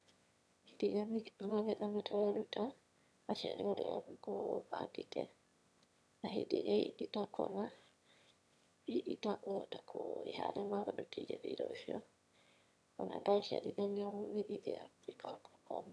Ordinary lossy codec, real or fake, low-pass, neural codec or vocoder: none; fake; none; autoencoder, 22.05 kHz, a latent of 192 numbers a frame, VITS, trained on one speaker